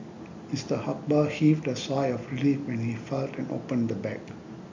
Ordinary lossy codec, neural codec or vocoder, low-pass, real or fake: MP3, 48 kbps; none; 7.2 kHz; real